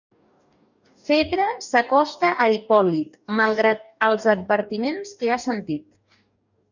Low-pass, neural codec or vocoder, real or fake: 7.2 kHz; codec, 44.1 kHz, 2.6 kbps, DAC; fake